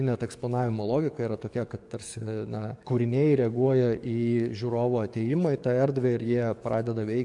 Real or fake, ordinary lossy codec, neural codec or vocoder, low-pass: fake; MP3, 64 kbps; codec, 44.1 kHz, 7.8 kbps, DAC; 10.8 kHz